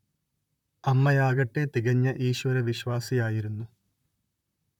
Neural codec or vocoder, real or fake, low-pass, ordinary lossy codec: vocoder, 44.1 kHz, 128 mel bands, Pupu-Vocoder; fake; 19.8 kHz; none